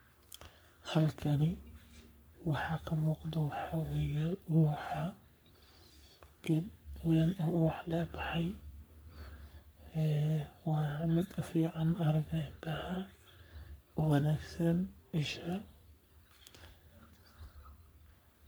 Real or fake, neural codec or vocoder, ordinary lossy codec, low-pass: fake; codec, 44.1 kHz, 3.4 kbps, Pupu-Codec; none; none